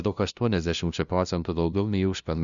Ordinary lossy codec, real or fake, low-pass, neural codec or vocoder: Opus, 64 kbps; fake; 7.2 kHz; codec, 16 kHz, 0.5 kbps, FunCodec, trained on LibriTTS, 25 frames a second